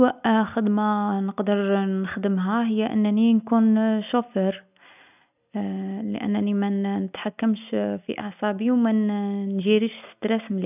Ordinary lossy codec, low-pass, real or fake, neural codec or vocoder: none; 3.6 kHz; real; none